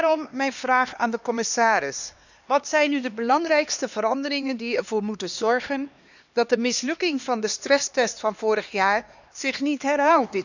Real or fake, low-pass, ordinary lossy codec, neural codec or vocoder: fake; 7.2 kHz; none; codec, 16 kHz, 2 kbps, X-Codec, HuBERT features, trained on LibriSpeech